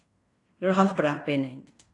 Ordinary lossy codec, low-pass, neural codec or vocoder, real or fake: AAC, 48 kbps; 10.8 kHz; codec, 16 kHz in and 24 kHz out, 0.9 kbps, LongCat-Audio-Codec, fine tuned four codebook decoder; fake